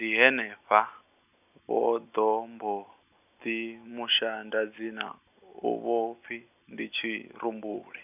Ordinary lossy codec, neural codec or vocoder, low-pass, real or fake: none; none; 3.6 kHz; real